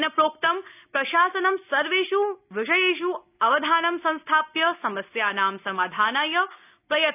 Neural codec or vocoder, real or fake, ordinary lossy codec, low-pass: none; real; none; 3.6 kHz